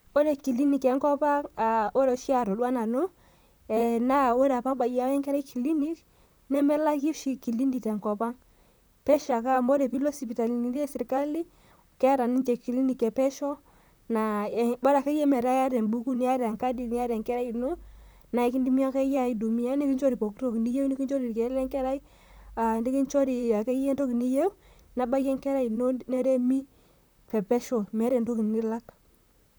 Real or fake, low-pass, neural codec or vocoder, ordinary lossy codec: fake; none; vocoder, 44.1 kHz, 128 mel bands, Pupu-Vocoder; none